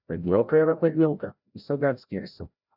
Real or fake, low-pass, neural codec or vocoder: fake; 5.4 kHz; codec, 16 kHz, 0.5 kbps, FreqCodec, larger model